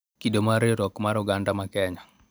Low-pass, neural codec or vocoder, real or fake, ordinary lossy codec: none; none; real; none